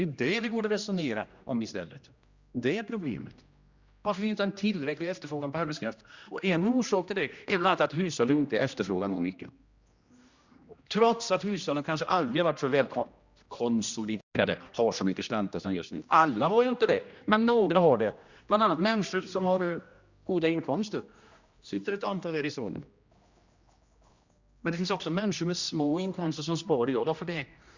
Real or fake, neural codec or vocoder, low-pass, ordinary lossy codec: fake; codec, 16 kHz, 1 kbps, X-Codec, HuBERT features, trained on general audio; 7.2 kHz; Opus, 64 kbps